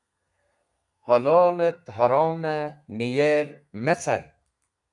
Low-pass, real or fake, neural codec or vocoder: 10.8 kHz; fake; codec, 32 kHz, 1.9 kbps, SNAC